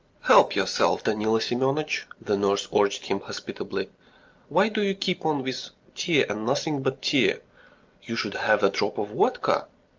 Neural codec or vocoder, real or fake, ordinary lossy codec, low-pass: none; real; Opus, 24 kbps; 7.2 kHz